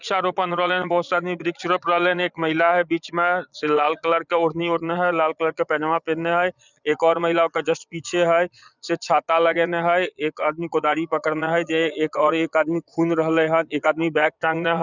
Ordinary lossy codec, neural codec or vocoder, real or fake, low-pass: none; vocoder, 22.05 kHz, 80 mel bands, Vocos; fake; 7.2 kHz